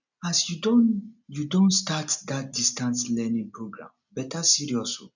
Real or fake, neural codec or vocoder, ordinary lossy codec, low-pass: real; none; none; 7.2 kHz